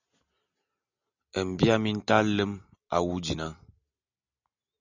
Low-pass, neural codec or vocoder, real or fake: 7.2 kHz; none; real